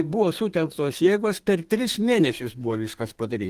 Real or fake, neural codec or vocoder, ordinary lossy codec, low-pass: fake; codec, 32 kHz, 1.9 kbps, SNAC; Opus, 24 kbps; 14.4 kHz